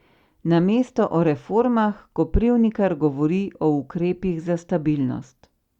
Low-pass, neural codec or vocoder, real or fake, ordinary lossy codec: 19.8 kHz; none; real; Opus, 64 kbps